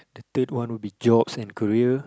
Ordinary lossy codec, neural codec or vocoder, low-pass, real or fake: none; none; none; real